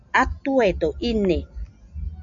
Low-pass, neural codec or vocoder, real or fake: 7.2 kHz; none; real